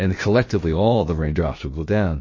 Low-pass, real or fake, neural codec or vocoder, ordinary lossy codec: 7.2 kHz; fake; codec, 16 kHz, about 1 kbps, DyCAST, with the encoder's durations; MP3, 32 kbps